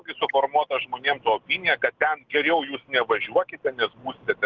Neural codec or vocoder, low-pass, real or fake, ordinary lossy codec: none; 7.2 kHz; real; Opus, 16 kbps